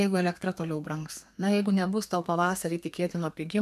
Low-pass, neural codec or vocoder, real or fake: 14.4 kHz; codec, 32 kHz, 1.9 kbps, SNAC; fake